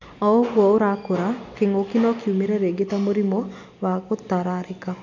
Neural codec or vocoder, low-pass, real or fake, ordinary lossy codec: none; 7.2 kHz; real; none